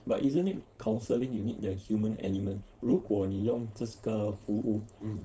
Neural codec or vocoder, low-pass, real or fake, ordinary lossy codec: codec, 16 kHz, 4.8 kbps, FACodec; none; fake; none